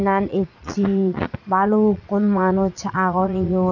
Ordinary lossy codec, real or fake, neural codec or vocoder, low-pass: none; fake; vocoder, 22.05 kHz, 80 mel bands, Vocos; 7.2 kHz